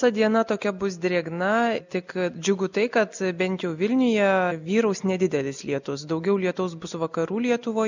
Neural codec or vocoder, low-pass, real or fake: none; 7.2 kHz; real